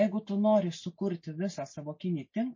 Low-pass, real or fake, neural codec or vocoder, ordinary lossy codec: 7.2 kHz; real; none; MP3, 32 kbps